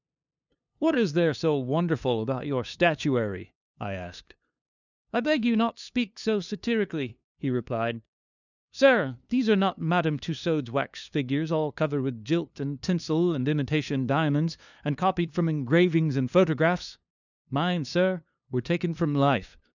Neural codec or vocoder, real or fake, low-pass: codec, 16 kHz, 2 kbps, FunCodec, trained on LibriTTS, 25 frames a second; fake; 7.2 kHz